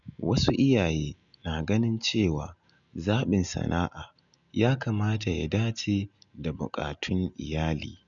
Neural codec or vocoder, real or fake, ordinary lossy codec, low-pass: none; real; none; 7.2 kHz